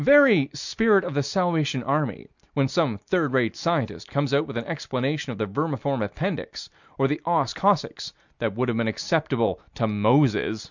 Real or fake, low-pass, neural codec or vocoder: real; 7.2 kHz; none